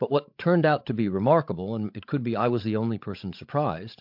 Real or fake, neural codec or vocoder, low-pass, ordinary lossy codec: fake; codec, 16 kHz, 16 kbps, FreqCodec, larger model; 5.4 kHz; MP3, 48 kbps